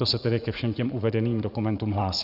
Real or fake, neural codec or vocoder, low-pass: fake; vocoder, 44.1 kHz, 80 mel bands, Vocos; 5.4 kHz